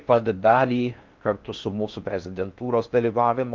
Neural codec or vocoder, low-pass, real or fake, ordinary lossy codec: codec, 16 kHz in and 24 kHz out, 0.6 kbps, FocalCodec, streaming, 4096 codes; 7.2 kHz; fake; Opus, 24 kbps